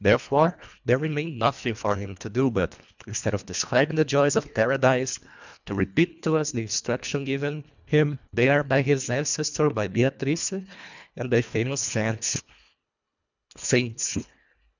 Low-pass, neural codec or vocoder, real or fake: 7.2 kHz; codec, 24 kHz, 1.5 kbps, HILCodec; fake